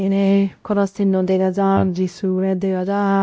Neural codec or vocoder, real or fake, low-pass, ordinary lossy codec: codec, 16 kHz, 0.5 kbps, X-Codec, WavLM features, trained on Multilingual LibriSpeech; fake; none; none